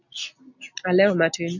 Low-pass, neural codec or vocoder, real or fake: 7.2 kHz; none; real